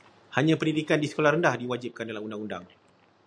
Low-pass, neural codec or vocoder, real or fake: 9.9 kHz; none; real